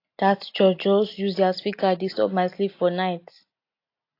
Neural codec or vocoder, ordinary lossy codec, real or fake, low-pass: none; AAC, 32 kbps; real; 5.4 kHz